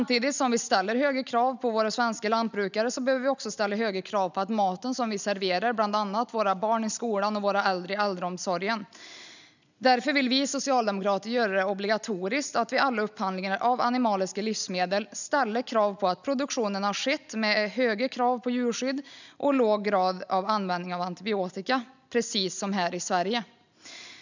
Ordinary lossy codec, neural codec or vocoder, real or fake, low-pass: none; none; real; 7.2 kHz